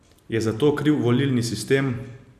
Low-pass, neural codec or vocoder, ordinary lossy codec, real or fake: 14.4 kHz; none; none; real